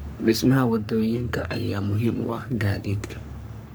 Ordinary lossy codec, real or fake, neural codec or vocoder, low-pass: none; fake; codec, 44.1 kHz, 3.4 kbps, Pupu-Codec; none